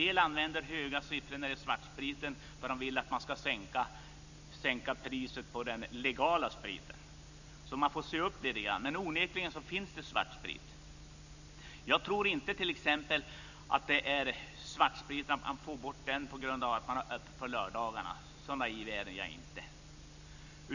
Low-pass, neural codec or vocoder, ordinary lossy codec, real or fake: 7.2 kHz; none; none; real